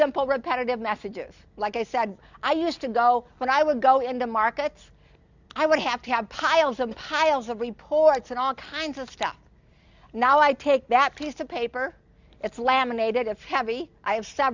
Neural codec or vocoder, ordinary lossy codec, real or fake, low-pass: none; Opus, 64 kbps; real; 7.2 kHz